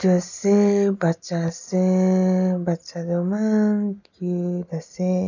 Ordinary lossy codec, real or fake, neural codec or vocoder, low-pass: none; real; none; 7.2 kHz